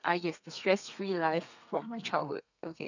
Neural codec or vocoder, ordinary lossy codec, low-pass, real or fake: codec, 32 kHz, 1.9 kbps, SNAC; none; 7.2 kHz; fake